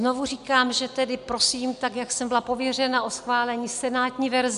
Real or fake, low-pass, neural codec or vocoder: real; 10.8 kHz; none